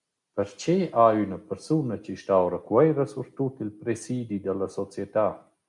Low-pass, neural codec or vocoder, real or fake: 10.8 kHz; none; real